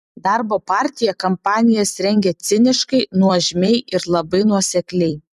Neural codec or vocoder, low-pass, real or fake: vocoder, 44.1 kHz, 128 mel bands every 256 samples, BigVGAN v2; 14.4 kHz; fake